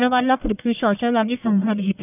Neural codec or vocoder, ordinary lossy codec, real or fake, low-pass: codec, 44.1 kHz, 1.7 kbps, Pupu-Codec; none; fake; 3.6 kHz